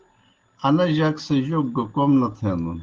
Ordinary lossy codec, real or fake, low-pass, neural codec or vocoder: Opus, 16 kbps; real; 7.2 kHz; none